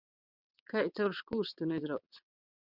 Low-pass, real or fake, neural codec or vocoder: 5.4 kHz; fake; vocoder, 22.05 kHz, 80 mel bands, WaveNeXt